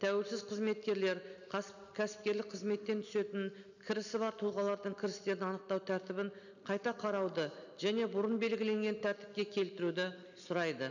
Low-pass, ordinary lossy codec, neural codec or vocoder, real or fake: 7.2 kHz; none; none; real